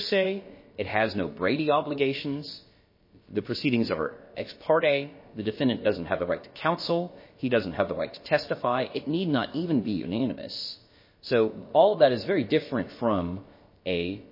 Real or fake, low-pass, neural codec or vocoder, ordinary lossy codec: fake; 5.4 kHz; codec, 16 kHz, about 1 kbps, DyCAST, with the encoder's durations; MP3, 24 kbps